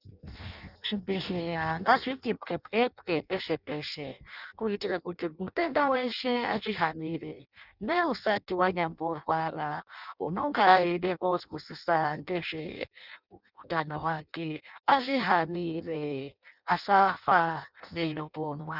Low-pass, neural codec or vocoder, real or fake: 5.4 kHz; codec, 16 kHz in and 24 kHz out, 0.6 kbps, FireRedTTS-2 codec; fake